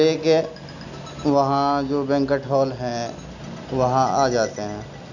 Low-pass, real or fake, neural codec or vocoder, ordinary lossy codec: 7.2 kHz; real; none; none